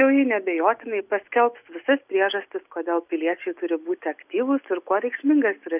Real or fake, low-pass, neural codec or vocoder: real; 3.6 kHz; none